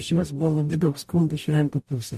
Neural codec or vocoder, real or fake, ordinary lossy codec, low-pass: codec, 44.1 kHz, 0.9 kbps, DAC; fake; MP3, 64 kbps; 14.4 kHz